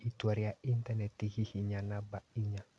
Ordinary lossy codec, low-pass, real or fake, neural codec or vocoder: none; none; real; none